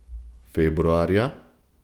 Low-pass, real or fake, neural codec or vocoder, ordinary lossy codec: 19.8 kHz; fake; autoencoder, 48 kHz, 128 numbers a frame, DAC-VAE, trained on Japanese speech; Opus, 24 kbps